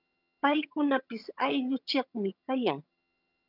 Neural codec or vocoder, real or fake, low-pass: vocoder, 22.05 kHz, 80 mel bands, HiFi-GAN; fake; 5.4 kHz